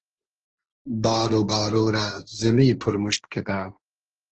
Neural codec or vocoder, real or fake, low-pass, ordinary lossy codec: codec, 16 kHz, 1.1 kbps, Voila-Tokenizer; fake; 7.2 kHz; Opus, 16 kbps